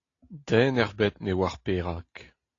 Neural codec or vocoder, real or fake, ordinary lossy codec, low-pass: none; real; AAC, 32 kbps; 7.2 kHz